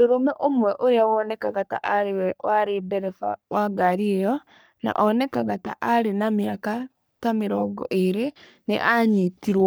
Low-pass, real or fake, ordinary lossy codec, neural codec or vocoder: none; fake; none; codec, 44.1 kHz, 3.4 kbps, Pupu-Codec